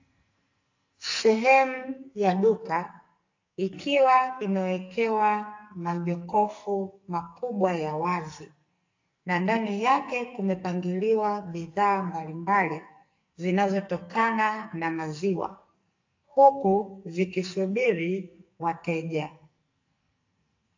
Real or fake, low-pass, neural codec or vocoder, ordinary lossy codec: fake; 7.2 kHz; codec, 44.1 kHz, 2.6 kbps, SNAC; AAC, 48 kbps